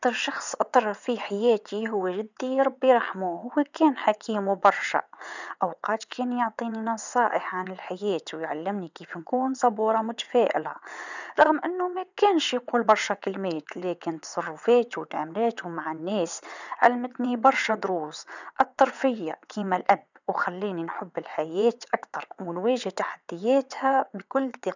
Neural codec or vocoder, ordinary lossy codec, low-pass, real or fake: vocoder, 22.05 kHz, 80 mel bands, WaveNeXt; none; 7.2 kHz; fake